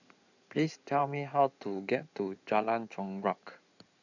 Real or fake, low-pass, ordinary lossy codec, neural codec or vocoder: fake; 7.2 kHz; none; codec, 16 kHz in and 24 kHz out, 2.2 kbps, FireRedTTS-2 codec